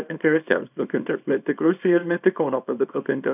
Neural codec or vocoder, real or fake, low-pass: codec, 24 kHz, 0.9 kbps, WavTokenizer, small release; fake; 3.6 kHz